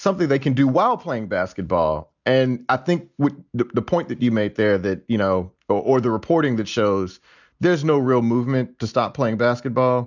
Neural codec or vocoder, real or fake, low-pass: none; real; 7.2 kHz